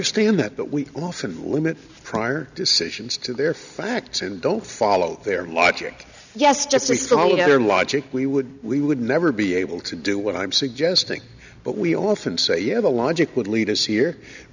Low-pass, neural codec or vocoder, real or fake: 7.2 kHz; none; real